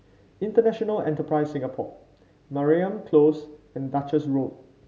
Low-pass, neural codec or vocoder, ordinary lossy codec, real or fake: none; none; none; real